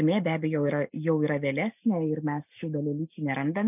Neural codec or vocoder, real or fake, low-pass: none; real; 3.6 kHz